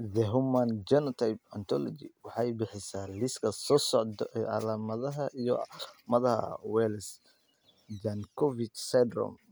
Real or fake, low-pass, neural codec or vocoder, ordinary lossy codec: real; none; none; none